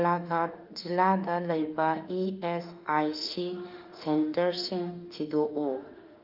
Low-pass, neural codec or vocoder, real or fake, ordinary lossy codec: 5.4 kHz; autoencoder, 48 kHz, 32 numbers a frame, DAC-VAE, trained on Japanese speech; fake; Opus, 24 kbps